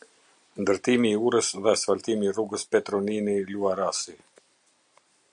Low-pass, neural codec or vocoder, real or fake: 9.9 kHz; none; real